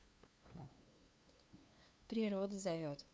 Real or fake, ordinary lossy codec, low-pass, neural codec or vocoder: fake; none; none; codec, 16 kHz, 2 kbps, FunCodec, trained on LibriTTS, 25 frames a second